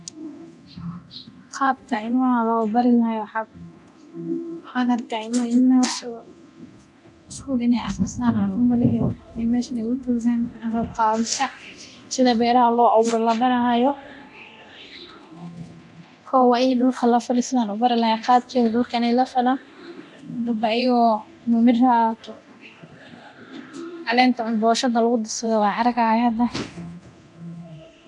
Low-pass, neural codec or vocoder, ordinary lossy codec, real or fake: 10.8 kHz; codec, 24 kHz, 0.9 kbps, DualCodec; none; fake